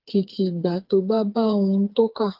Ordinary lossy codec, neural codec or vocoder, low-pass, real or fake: Opus, 32 kbps; codec, 16 kHz, 4 kbps, FreqCodec, smaller model; 5.4 kHz; fake